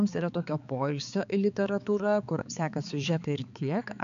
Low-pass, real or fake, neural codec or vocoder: 7.2 kHz; fake; codec, 16 kHz, 4 kbps, X-Codec, HuBERT features, trained on balanced general audio